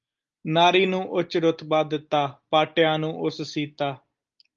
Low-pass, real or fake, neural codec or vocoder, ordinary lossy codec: 7.2 kHz; real; none; Opus, 32 kbps